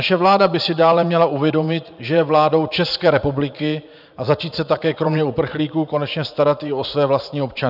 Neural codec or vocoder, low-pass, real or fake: vocoder, 44.1 kHz, 80 mel bands, Vocos; 5.4 kHz; fake